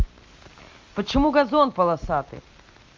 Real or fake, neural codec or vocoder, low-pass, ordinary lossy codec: real; none; 7.2 kHz; Opus, 32 kbps